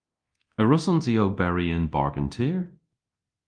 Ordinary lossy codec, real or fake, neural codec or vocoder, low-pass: Opus, 32 kbps; fake; codec, 24 kHz, 0.9 kbps, DualCodec; 9.9 kHz